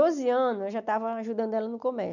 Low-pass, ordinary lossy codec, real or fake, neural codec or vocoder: 7.2 kHz; none; real; none